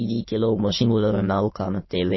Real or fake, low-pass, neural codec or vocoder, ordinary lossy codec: fake; 7.2 kHz; autoencoder, 22.05 kHz, a latent of 192 numbers a frame, VITS, trained on many speakers; MP3, 24 kbps